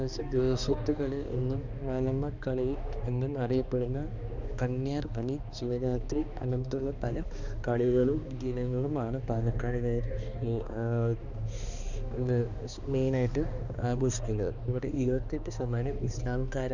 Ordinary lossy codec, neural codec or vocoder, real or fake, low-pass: none; codec, 16 kHz, 2 kbps, X-Codec, HuBERT features, trained on balanced general audio; fake; 7.2 kHz